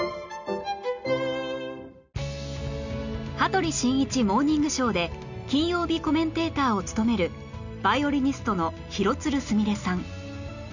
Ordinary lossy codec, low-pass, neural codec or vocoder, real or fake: none; 7.2 kHz; none; real